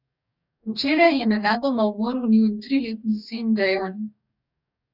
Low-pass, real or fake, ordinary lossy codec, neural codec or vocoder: 5.4 kHz; fake; none; codec, 44.1 kHz, 2.6 kbps, DAC